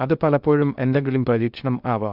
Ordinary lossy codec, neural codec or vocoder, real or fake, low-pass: none; codec, 16 kHz in and 24 kHz out, 0.6 kbps, FocalCodec, streaming, 2048 codes; fake; 5.4 kHz